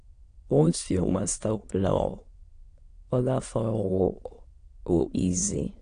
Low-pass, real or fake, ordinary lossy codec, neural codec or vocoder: 9.9 kHz; fake; AAC, 48 kbps; autoencoder, 22.05 kHz, a latent of 192 numbers a frame, VITS, trained on many speakers